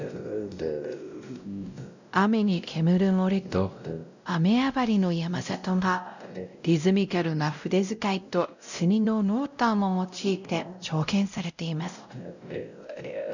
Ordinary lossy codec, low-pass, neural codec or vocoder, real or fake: none; 7.2 kHz; codec, 16 kHz, 0.5 kbps, X-Codec, WavLM features, trained on Multilingual LibriSpeech; fake